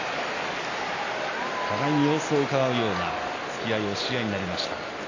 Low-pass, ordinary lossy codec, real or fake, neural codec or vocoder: 7.2 kHz; AAC, 32 kbps; real; none